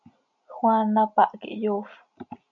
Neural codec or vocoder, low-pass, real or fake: none; 7.2 kHz; real